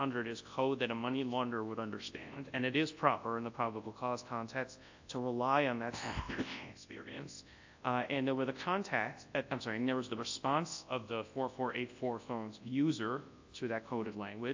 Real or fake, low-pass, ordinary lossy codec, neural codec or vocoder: fake; 7.2 kHz; AAC, 48 kbps; codec, 24 kHz, 0.9 kbps, WavTokenizer, large speech release